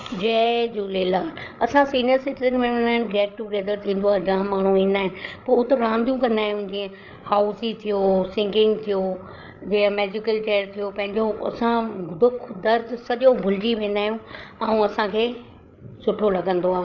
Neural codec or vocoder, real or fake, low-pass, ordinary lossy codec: codec, 16 kHz, 8 kbps, FreqCodec, larger model; fake; 7.2 kHz; none